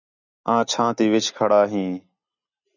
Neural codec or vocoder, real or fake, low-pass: none; real; 7.2 kHz